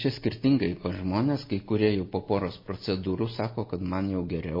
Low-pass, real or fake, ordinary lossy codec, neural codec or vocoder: 5.4 kHz; real; MP3, 24 kbps; none